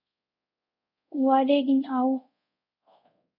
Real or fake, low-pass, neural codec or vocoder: fake; 5.4 kHz; codec, 24 kHz, 0.5 kbps, DualCodec